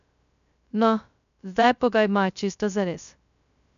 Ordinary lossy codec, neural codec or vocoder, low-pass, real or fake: none; codec, 16 kHz, 0.2 kbps, FocalCodec; 7.2 kHz; fake